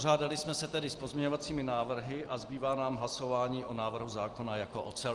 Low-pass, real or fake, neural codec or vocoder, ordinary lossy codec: 10.8 kHz; real; none; Opus, 24 kbps